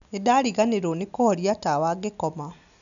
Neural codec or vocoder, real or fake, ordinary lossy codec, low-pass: none; real; none; 7.2 kHz